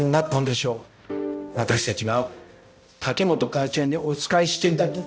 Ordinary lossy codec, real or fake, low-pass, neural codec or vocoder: none; fake; none; codec, 16 kHz, 0.5 kbps, X-Codec, HuBERT features, trained on balanced general audio